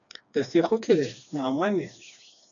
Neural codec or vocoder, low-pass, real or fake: codec, 16 kHz, 2 kbps, FreqCodec, smaller model; 7.2 kHz; fake